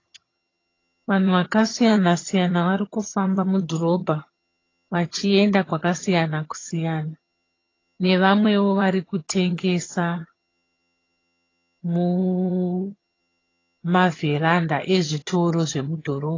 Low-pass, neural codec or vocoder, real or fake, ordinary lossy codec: 7.2 kHz; vocoder, 22.05 kHz, 80 mel bands, HiFi-GAN; fake; AAC, 32 kbps